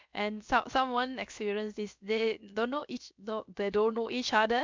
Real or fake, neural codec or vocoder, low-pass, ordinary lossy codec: fake; codec, 16 kHz, about 1 kbps, DyCAST, with the encoder's durations; 7.2 kHz; MP3, 64 kbps